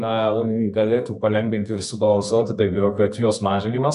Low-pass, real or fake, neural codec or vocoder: 10.8 kHz; fake; codec, 24 kHz, 0.9 kbps, WavTokenizer, medium music audio release